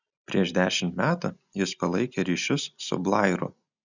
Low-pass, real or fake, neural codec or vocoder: 7.2 kHz; real; none